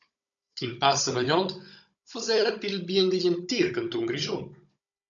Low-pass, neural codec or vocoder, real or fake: 7.2 kHz; codec, 16 kHz, 16 kbps, FunCodec, trained on Chinese and English, 50 frames a second; fake